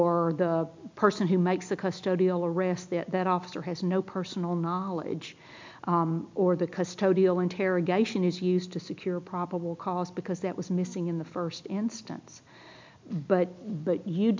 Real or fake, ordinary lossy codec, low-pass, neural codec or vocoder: real; MP3, 64 kbps; 7.2 kHz; none